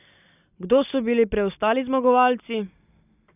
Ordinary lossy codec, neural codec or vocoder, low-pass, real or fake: none; none; 3.6 kHz; real